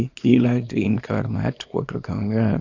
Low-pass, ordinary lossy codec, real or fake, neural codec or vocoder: 7.2 kHz; AAC, 48 kbps; fake; codec, 24 kHz, 0.9 kbps, WavTokenizer, small release